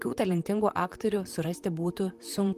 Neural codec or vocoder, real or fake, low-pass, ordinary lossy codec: vocoder, 44.1 kHz, 128 mel bands, Pupu-Vocoder; fake; 14.4 kHz; Opus, 32 kbps